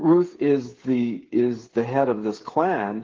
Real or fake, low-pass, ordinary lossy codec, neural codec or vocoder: fake; 7.2 kHz; Opus, 16 kbps; codec, 16 kHz, 8 kbps, FreqCodec, smaller model